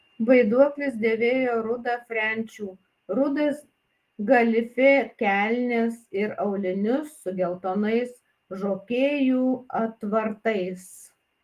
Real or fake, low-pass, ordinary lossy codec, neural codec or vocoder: real; 14.4 kHz; Opus, 24 kbps; none